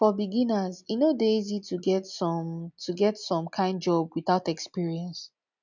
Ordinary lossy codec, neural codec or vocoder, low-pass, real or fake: none; none; 7.2 kHz; real